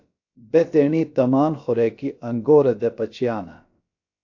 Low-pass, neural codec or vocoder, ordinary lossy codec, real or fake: 7.2 kHz; codec, 16 kHz, about 1 kbps, DyCAST, with the encoder's durations; AAC, 48 kbps; fake